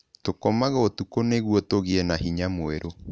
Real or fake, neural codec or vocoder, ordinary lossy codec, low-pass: real; none; none; none